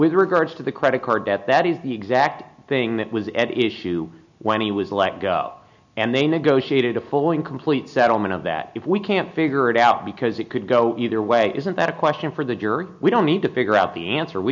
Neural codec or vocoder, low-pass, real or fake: none; 7.2 kHz; real